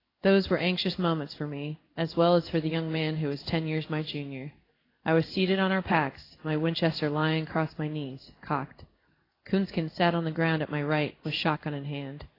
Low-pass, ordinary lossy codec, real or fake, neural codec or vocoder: 5.4 kHz; AAC, 24 kbps; fake; codec, 16 kHz in and 24 kHz out, 1 kbps, XY-Tokenizer